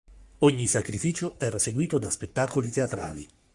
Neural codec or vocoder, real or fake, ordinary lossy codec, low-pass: codec, 44.1 kHz, 3.4 kbps, Pupu-Codec; fake; Opus, 64 kbps; 10.8 kHz